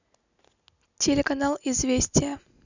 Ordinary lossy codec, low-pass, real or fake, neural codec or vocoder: none; 7.2 kHz; real; none